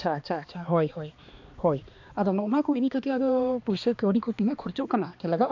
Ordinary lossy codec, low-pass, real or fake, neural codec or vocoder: AAC, 48 kbps; 7.2 kHz; fake; codec, 16 kHz, 2 kbps, X-Codec, HuBERT features, trained on balanced general audio